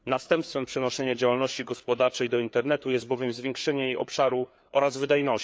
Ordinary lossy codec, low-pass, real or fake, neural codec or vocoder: none; none; fake; codec, 16 kHz, 4 kbps, FreqCodec, larger model